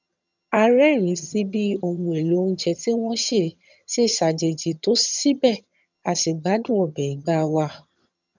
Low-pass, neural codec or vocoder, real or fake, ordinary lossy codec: 7.2 kHz; vocoder, 22.05 kHz, 80 mel bands, HiFi-GAN; fake; none